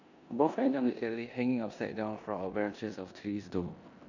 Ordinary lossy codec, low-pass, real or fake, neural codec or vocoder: none; 7.2 kHz; fake; codec, 16 kHz in and 24 kHz out, 0.9 kbps, LongCat-Audio-Codec, four codebook decoder